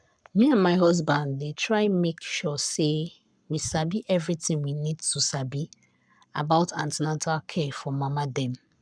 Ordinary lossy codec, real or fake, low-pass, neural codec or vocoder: none; fake; 9.9 kHz; codec, 44.1 kHz, 7.8 kbps, Pupu-Codec